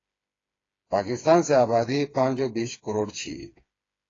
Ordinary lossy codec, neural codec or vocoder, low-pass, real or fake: AAC, 32 kbps; codec, 16 kHz, 4 kbps, FreqCodec, smaller model; 7.2 kHz; fake